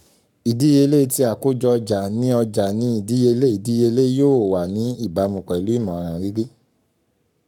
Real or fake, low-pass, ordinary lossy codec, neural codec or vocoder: fake; 19.8 kHz; none; codec, 44.1 kHz, 7.8 kbps, Pupu-Codec